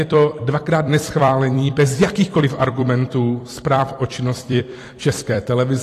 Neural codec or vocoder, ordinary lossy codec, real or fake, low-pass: vocoder, 44.1 kHz, 128 mel bands every 256 samples, BigVGAN v2; AAC, 48 kbps; fake; 14.4 kHz